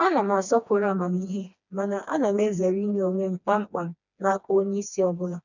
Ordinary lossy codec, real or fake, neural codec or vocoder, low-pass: none; fake; codec, 16 kHz, 2 kbps, FreqCodec, smaller model; 7.2 kHz